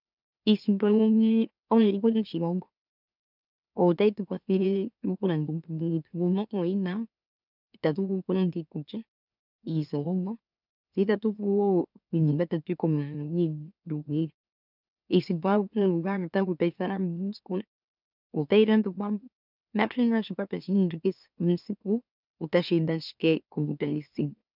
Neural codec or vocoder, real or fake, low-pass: autoencoder, 44.1 kHz, a latent of 192 numbers a frame, MeloTTS; fake; 5.4 kHz